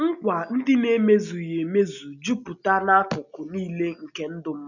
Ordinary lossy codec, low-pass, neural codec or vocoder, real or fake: none; 7.2 kHz; none; real